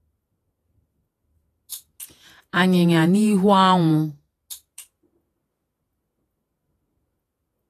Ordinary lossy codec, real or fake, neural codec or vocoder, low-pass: AAC, 48 kbps; fake; vocoder, 48 kHz, 128 mel bands, Vocos; 14.4 kHz